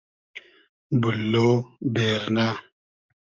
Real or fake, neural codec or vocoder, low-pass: fake; codec, 44.1 kHz, 7.8 kbps, DAC; 7.2 kHz